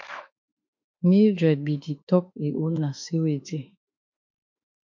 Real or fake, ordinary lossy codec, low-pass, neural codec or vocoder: fake; MP3, 48 kbps; 7.2 kHz; autoencoder, 48 kHz, 32 numbers a frame, DAC-VAE, trained on Japanese speech